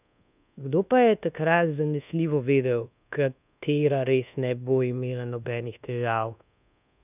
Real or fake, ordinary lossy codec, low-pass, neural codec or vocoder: fake; none; 3.6 kHz; codec, 24 kHz, 1.2 kbps, DualCodec